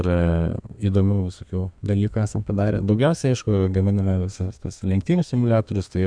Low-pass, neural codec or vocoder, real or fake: 9.9 kHz; codec, 32 kHz, 1.9 kbps, SNAC; fake